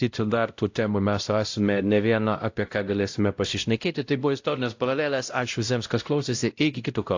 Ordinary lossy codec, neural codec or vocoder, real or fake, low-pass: AAC, 48 kbps; codec, 16 kHz, 0.5 kbps, X-Codec, WavLM features, trained on Multilingual LibriSpeech; fake; 7.2 kHz